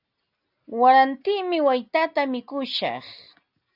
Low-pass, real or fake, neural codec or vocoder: 5.4 kHz; real; none